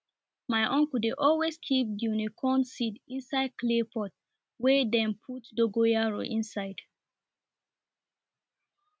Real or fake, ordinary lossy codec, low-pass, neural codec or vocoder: real; none; none; none